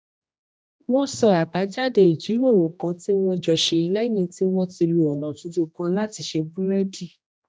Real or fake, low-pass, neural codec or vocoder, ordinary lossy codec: fake; none; codec, 16 kHz, 1 kbps, X-Codec, HuBERT features, trained on general audio; none